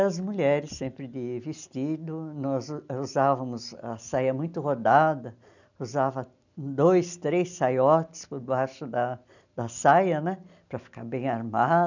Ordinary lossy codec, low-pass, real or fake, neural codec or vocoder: none; 7.2 kHz; real; none